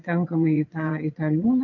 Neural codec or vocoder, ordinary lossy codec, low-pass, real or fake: vocoder, 22.05 kHz, 80 mel bands, WaveNeXt; AAC, 48 kbps; 7.2 kHz; fake